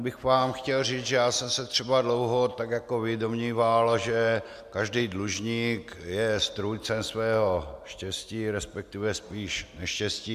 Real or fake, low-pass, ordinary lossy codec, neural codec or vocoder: real; 14.4 kHz; AAC, 96 kbps; none